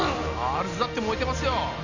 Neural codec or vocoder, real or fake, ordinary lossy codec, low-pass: none; real; none; 7.2 kHz